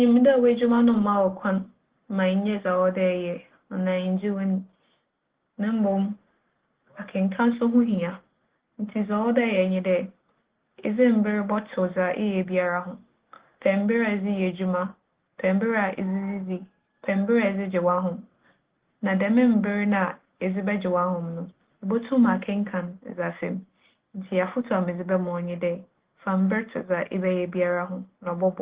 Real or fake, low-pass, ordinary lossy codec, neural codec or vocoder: real; 3.6 kHz; Opus, 16 kbps; none